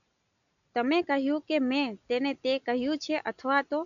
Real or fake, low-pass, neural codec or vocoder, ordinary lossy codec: real; 7.2 kHz; none; none